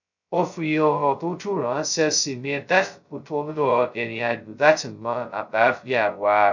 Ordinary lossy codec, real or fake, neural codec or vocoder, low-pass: none; fake; codec, 16 kHz, 0.2 kbps, FocalCodec; 7.2 kHz